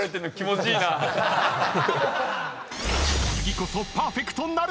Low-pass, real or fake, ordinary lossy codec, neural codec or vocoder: none; real; none; none